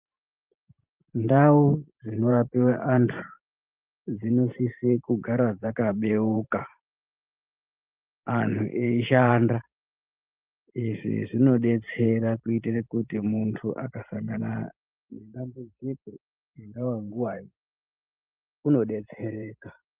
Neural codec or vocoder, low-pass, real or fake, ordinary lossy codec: vocoder, 44.1 kHz, 128 mel bands every 512 samples, BigVGAN v2; 3.6 kHz; fake; Opus, 24 kbps